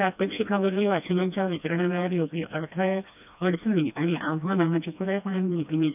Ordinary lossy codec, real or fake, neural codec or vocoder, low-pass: none; fake; codec, 16 kHz, 1 kbps, FreqCodec, smaller model; 3.6 kHz